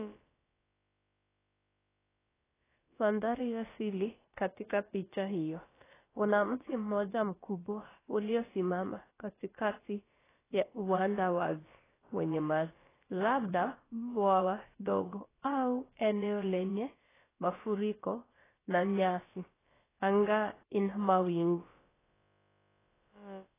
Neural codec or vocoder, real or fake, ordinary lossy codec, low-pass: codec, 16 kHz, about 1 kbps, DyCAST, with the encoder's durations; fake; AAC, 16 kbps; 3.6 kHz